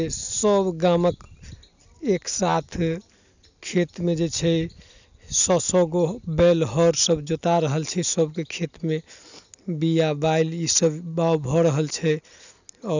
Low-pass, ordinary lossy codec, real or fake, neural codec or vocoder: 7.2 kHz; none; real; none